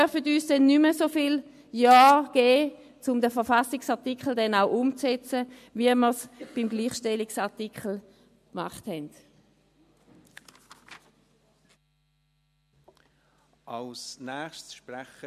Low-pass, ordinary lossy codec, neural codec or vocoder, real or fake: 14.4 kHz; MP3, 64 kbps; none; real